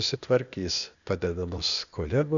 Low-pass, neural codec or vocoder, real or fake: 7.2 kHz; codec, 16 kHz, 0.8 kbps, ZipCodec; fake